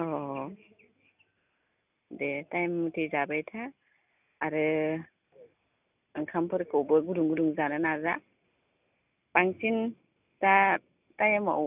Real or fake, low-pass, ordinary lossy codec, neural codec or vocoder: real; 3.6 kHz; none; none